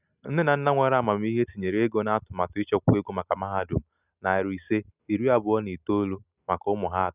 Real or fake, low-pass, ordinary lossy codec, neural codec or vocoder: real; 3.6 kHz; none; none